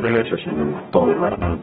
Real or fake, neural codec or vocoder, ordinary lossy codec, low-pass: fake; codec, 44.1 kHz, 0.9 kbps, DAC; AAC, 16 kbps; 19.8 kHz